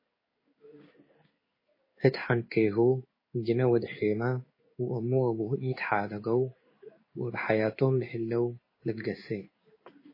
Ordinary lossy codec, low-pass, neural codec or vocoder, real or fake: MP3, 24 kbps; 5.4 kHz; codec, 16 kHz in and 24 kHz out, 1 kbps, XY-Tokenizer; fake